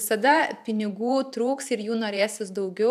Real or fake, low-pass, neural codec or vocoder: fake; 14.4 kHz; vocoder, 44.1 kHz, 128 mel bands every 512 samples, BigVGAN v2